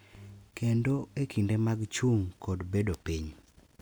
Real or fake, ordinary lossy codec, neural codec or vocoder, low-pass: real; none; none; none